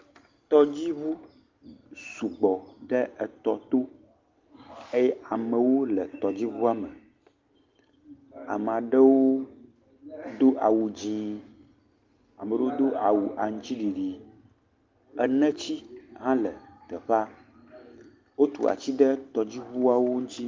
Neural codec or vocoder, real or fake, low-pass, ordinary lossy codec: none; real; 7.2 kHz; Opus, 32 kbps